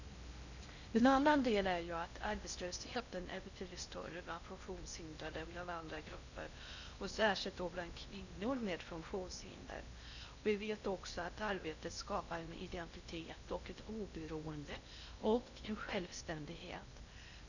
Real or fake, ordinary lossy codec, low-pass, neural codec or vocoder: fake; none; 7.2 kHz; codec, 16 kHz in and 24 kHz out, 0.6 kbps, FocalCodec, streaming, 4096 codes